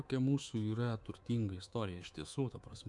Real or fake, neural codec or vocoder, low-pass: fake; codec, 24 kHz, 3.1 kbps, DualCodec; 10.8 kHz